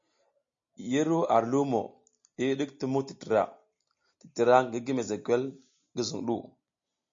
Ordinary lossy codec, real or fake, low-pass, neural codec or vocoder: MP3, 48 kbps; real; 7.2 kHz; none